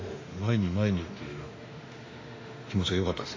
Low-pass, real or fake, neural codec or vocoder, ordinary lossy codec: 7.2 kHz; fake; autoencoder, 48 kHz, 32 numbers a frame, DAC-VAE, trained on Japanese speech; AAC, 32 kbps